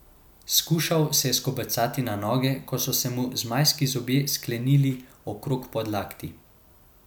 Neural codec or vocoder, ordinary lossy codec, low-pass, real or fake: none; none; none; real